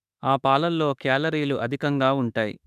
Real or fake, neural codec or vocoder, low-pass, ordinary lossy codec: fake; autoencoder, 48 kHz, 32 numbers a frame, DAC-VAE, trained on Japanese speech; 14.4 kHz; none